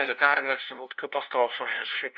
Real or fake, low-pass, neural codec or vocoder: fake; 7.2 kHz; codec, 16 kHz, 0.5 kbps, FunCodec, trained on LibriTTS, 25 frames a second